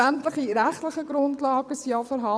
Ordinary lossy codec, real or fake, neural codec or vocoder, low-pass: none; fake; vocoder, 22.05 kHz, 80 mel bands, WaveNeXt; none